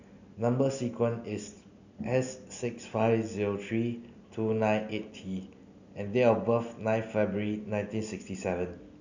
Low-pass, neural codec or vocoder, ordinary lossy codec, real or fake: 7.2 kHz; none; none; real